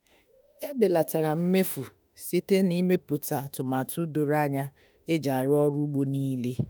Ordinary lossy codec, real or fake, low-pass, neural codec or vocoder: none; fake; none; autoencoder, 48 kHz, 32 numbers a frame, DAC-VAE, trained on Japanese speech